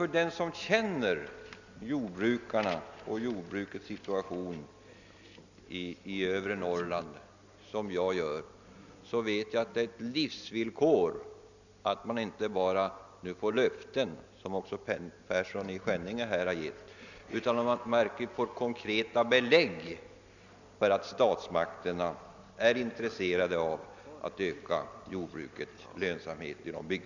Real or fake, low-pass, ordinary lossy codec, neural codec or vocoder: real; 7.2 kHz; none; none